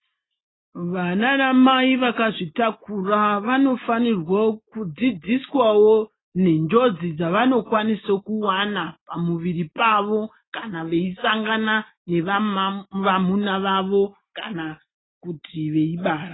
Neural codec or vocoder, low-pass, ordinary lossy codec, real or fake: none; 7.2 kHz; AAC, 16 kbps; real